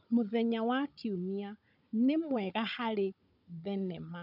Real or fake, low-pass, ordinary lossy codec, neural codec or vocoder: fake; 5.4 kHz; none; codec, 16 kHz, 16 kbps, FunCodec, trained on Chinese and English, 50 frames a second